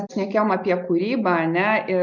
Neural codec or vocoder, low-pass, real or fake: none; 7.2 kHz; real